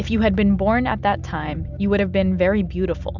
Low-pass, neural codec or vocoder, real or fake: 7.2 kHz; none; real